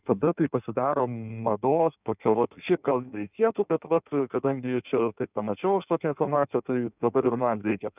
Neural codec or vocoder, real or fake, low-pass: codec, 16 kHz in and 24 kHz out, 1.1 kbps, FireRedTTS-2 codec; fake; 3.6 kHz